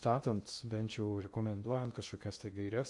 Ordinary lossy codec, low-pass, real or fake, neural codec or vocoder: Opus, 64 kbps; 10.8 kHz; fake; codec, 16 kHz in and 24 kHz out, 0.8 kbps, FocalCodec, streaming, 65536 codes